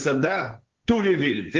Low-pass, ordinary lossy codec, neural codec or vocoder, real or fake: 7.2 kHz; Opus, 24 kbps; codec, 16 kHz, 1.1 kbps, Voila-Tokenizer; fake